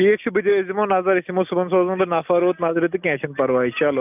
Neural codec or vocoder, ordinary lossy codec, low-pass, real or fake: none; none; 3.6 kHz; real